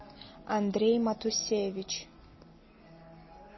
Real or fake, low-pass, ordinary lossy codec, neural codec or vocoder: real; 7.2 kHz; MP3, 24 kbps; none